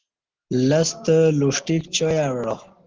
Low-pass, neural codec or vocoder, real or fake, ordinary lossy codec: 7.2 kHz; none; real; Opus, 16 kbps